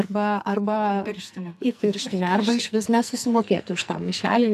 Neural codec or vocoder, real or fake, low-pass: codec, 44.1 kHz, 2.6 kbps, SNAC; fake; 14.4 kHz